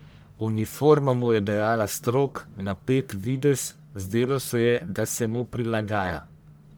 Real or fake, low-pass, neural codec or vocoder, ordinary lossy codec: fake; none; codec, 44.1 kHz, 1.7 kbps, Pupu-Codec; none